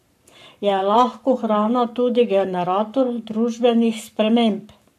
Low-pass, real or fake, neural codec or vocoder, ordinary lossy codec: 14.4 kHz; fake; codec, 44.1 kHz, 7.8 kbps, Pupu-Codec; none